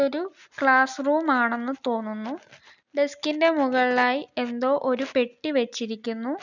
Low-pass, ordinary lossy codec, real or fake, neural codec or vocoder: 7.2 kHz; none; real; none